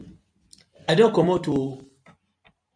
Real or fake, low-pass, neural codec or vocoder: real; 9.9 kHz; none